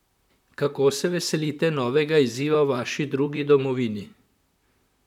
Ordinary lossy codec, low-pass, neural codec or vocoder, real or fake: none; 19.8 kHz; vocoder, 44.1 kHz, 128 mel bands, Pupu-Vocoder; fake